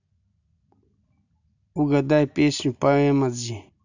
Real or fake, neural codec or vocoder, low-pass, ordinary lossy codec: real; none; 7.2 kHz; none